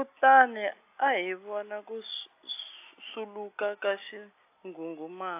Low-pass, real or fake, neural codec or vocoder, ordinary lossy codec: 3.6 kHz; real; none; AAC, 24 kbps